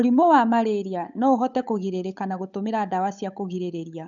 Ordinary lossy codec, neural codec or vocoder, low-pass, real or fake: none; codec, 16 kHz, 8 kbps, FunCodec, trained on Chinese and English, 25 frames a second; 7.2 kHz; fake